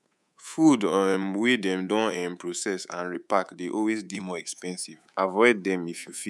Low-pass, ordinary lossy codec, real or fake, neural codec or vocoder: none; none; fake; codec, 24 kHz, 3.1 kbps, DualCodec